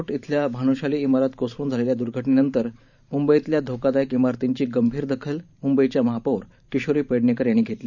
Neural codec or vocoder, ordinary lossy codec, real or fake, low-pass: vocoder, 44.1 kHz, 128 mel bands every 512 samples, BigVGAN v2; none; fake; 7.2 kHz